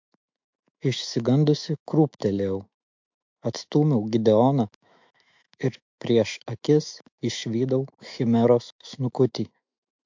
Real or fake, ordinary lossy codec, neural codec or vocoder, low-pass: fake; MP3, 48 kbps; autoencoder, 48 kHz, 128 numbers a frame, DAC-VAE, trained on Japanese speech; 7.2 kHz